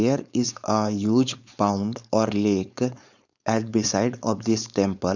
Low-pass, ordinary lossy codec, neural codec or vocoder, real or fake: 7.2 kHz; none; codec, 16 kHz, 4.8 kbps, FACodec; fake